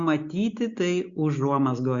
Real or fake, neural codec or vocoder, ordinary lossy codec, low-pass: real; none; Opus, 64 kbps; 7.2 kHz